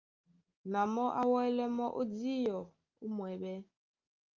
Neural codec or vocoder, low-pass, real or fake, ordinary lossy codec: none; 7.2 kHz; real; Opus, 32 kbps